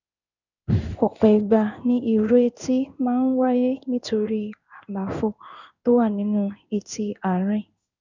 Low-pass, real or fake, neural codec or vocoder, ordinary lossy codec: 7.2 kHz; fake; codec, 16 kHz in and 24 kHz out, 1 kbps, XY-Tokenizer; none